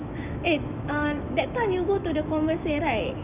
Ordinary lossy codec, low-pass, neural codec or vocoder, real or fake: none; 3.6 kHz; autoencoder, 48 kHz, 128 numbers a frame, DAC-VAE, trained on Japanese speech; fake